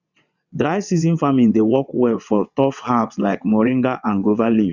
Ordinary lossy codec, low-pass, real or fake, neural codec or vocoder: none; 7.2 kHz; fake; vocoder, 22.05 kHz, 80 mel bands, WaveNeXt